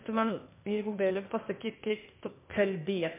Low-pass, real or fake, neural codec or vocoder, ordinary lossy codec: 3.6 kHz; fake; codec, 16 kHz, 0.8 kbps, ZipCodec; MP3, 32 kbps